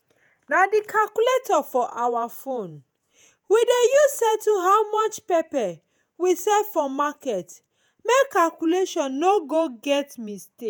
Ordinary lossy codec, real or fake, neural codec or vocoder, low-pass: none; fake; vocoder, 48 kHz, 128 mel bands, Vocos; none